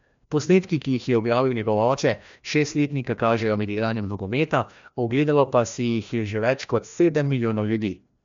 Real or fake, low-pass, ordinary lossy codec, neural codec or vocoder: fake; 7.2 kHz; MP3, 64 kbps; codec, 16 kHz, 1 kbps, FreqCodec, larger model